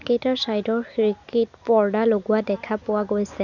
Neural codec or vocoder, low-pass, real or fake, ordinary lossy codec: none; 7.2 kHz; real; none